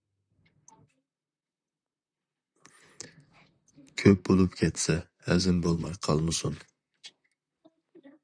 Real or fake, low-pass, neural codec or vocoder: fake; 9.9 kHz; vocoder, 44.1 kHz, 128 mel bands, Pupu-Vocoder